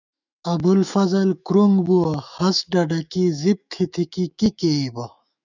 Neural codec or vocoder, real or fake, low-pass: autoencoder, 48 kHz, 128 numbers a frame, DAC-VAE, trained on Japanese speech; fake; 7.2 kHz